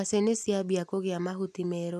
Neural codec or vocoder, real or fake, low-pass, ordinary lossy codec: none; real; 14.4 kHz; none